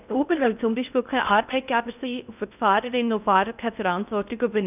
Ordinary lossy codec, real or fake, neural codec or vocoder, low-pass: none; fake; codec, 16 kHz in and 24 kHz out, 0.6 kbps, FocalCodec, streaming, 4096 codes; 3.6 kHz